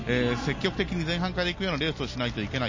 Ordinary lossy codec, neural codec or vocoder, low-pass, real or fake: MP3, 32 kbps; none; 7.2 kHz; real